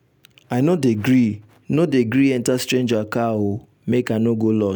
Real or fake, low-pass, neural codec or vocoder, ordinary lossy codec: real; none; none; none